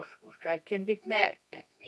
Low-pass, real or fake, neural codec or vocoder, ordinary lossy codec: none; fake; codec, 24 kHz, 0.9 kbps, WavTokenizer, medium music audio release; none